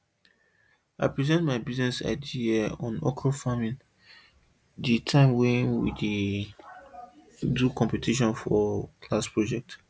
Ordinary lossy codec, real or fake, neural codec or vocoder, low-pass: none; real; none; none